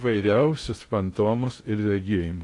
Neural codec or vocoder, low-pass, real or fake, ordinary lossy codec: codec, 16 kHz in and 24 kHz out, 0.6 kbps, FocalCodec, streaming, 2048 codes; 10.8 kHz; fake; AAC, 48 kbps